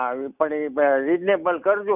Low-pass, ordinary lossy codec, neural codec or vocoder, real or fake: 3.6 kHz; none; none; real